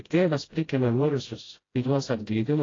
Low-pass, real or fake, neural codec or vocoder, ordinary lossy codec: 7.2 kHz; fake; codec, 16 kHz, 0.5 kbps, FreqCodec, smaller model; AAC, 32 kbps